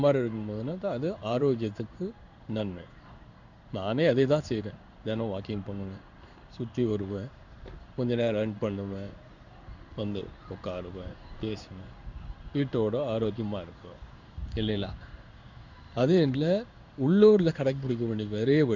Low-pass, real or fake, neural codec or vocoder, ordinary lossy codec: 7.2 kHz; fake; codec, 16 kHz in and 24 kHz out, 1 kbps, XY-Tokenizer; none